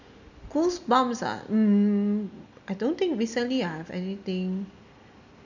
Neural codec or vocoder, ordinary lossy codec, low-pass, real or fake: none; none; 7.2 kHz; real